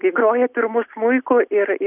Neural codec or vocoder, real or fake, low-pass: none; real; 3.6 kHz